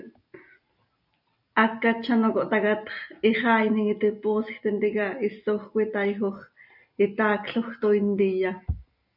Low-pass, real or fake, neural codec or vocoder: 5.4 kHz; real; none